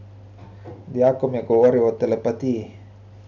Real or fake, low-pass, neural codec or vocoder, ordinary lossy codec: real; 7.2 kHz; none; none